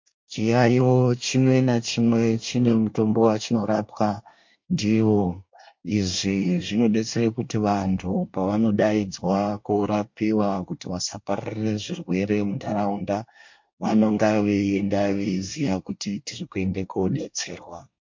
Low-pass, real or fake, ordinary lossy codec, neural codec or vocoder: 7.2 kHz; fake; MP3, 48 kbps; codec, 24 kHz, 1 kbps, SNAC